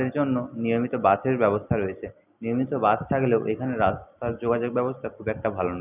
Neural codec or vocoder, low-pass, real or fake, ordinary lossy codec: none; 3.6 kHz; real; none